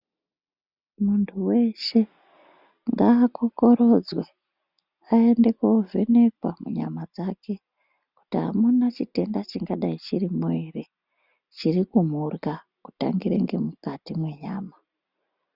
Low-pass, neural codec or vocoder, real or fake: 5.4 kHz; none; real